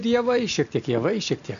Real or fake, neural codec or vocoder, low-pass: real; none; 7.2 kHz